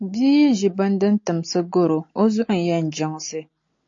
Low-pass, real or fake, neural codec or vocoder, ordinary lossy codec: 7.2 kHz; real; none; AAC, 48 kbps